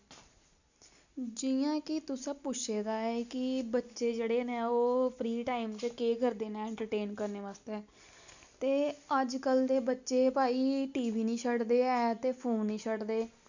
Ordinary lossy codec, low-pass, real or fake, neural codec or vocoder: none; 7.2 kHz; real; none